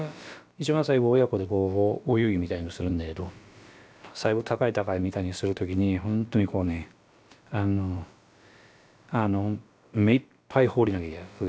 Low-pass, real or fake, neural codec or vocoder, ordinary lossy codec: none; fake; codec, 16 kHz, about 1 kbps, DyCAST, with the encoder's durations; none